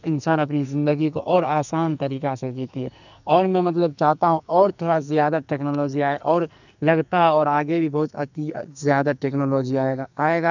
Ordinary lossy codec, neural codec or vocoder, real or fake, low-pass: none; codec, 44.1 kHz, 2.6 kbps, SNAC; fake; 7.2 kHz